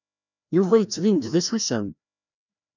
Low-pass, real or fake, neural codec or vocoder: 7.2 kHz; fake; codec, 16 kHz, 1 kbps, FreqCodec, larger model